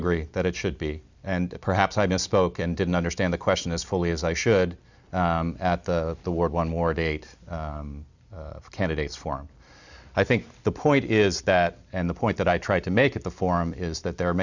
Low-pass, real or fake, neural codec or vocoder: 7.2 kHz; real; none